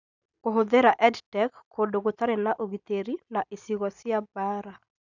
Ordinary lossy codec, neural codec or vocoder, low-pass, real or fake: none; none; 7.2 kHz; real